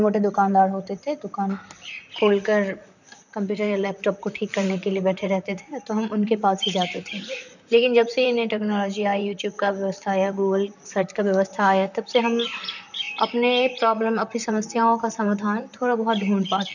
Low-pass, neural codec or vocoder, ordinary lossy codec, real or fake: 7.2 kHz; vocoder, 44.1 kHz, 128 mel bands, Pupu-Vocoder; none; fake